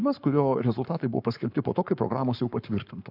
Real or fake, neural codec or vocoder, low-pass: fake; codec, 44.1 kHz, 7.8 kbps, Pupu-Codec; 5.4 kHz